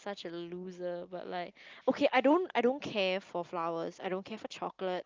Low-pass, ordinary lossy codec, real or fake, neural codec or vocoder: 7.2 kHz; Opus, 24 kbps; real; none